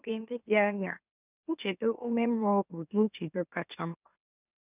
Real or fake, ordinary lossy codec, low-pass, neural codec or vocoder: fake; none; 3.6 kHz; autoencoder, 44.1 kHz, a latent of 192 numbers a frame, MeloTTS